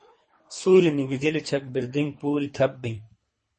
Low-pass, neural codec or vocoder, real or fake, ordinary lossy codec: 10.8 kHz; codec, 24 kHz, 3 kbps, HILCodec; fake; MP3, 32 kbps